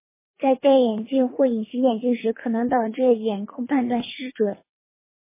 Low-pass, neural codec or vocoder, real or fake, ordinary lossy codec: 3.6 kHz; codec, 24 kHz, 3 kbps, HILCodec; fake; MP3, 16 kbps